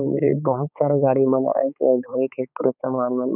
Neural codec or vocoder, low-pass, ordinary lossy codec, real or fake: codec, 16 kHz, 4 kbps, X-Codec, HuBERT features, trained on balanced general audio; 3.6 kHz; none; fake